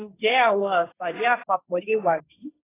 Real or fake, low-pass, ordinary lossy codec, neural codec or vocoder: fake; 3.6 kHz; AAC, 16 kbps; codec, 16 kHz, 1.1 kbps, Voila-Tokenizer